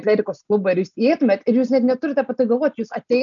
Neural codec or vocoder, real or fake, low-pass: none; real; 7.2 kHz